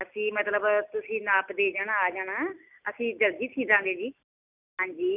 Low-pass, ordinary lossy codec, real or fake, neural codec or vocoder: 3.6 kHz; none; real; none